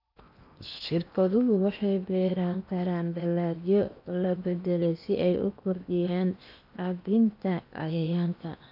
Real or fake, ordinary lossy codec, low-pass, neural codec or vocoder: fake; none; 5.4 kHz; codec, 16 kHz in and 24 kHz out, 0.8 kbps, FocalCodec, streaming, 65536 codes